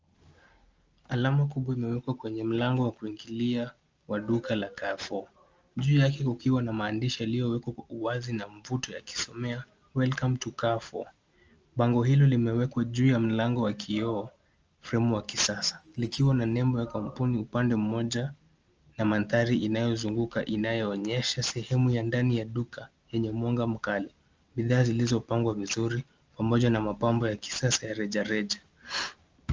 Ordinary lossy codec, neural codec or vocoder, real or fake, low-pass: Opus, 24 kbps; none; real; 7.2 kHz